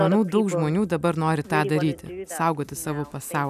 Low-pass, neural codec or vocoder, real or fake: 14.4 kHz; none; real